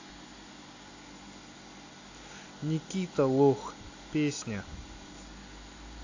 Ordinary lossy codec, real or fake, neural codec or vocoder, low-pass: AAC, 48 kbps; real; none; 7.2 kHz